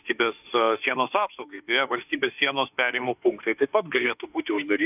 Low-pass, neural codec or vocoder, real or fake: 3.6 kHz; autoencoder, 48 kHz, 32 numbers a frame, DAC-VAE, trained on Japanese speech; fake